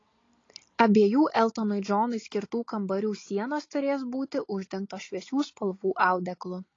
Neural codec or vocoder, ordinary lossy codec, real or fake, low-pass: none; AAC, 32 kbps; real; 7.2 kHz